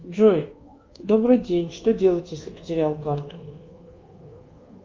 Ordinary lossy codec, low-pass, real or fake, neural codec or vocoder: Opus, 32 kbps; 7.2 kHz; fake; codec, 24 kHz, 1.2 kbps, DualCodec